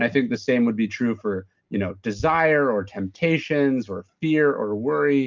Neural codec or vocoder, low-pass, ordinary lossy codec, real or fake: none; 7.2 kHz; Opus, 24 kbps; real